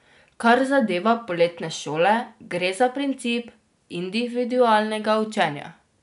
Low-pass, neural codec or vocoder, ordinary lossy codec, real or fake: 10.8 kHz; none; none; real